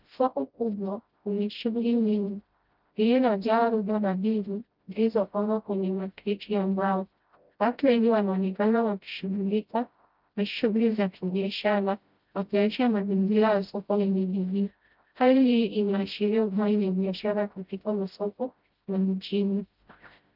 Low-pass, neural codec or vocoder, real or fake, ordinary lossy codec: 5.4 kHz; codec, 16 kHz, 0.5 kbps, FreqCodec, smaller model; fake; Opus, 24 kbps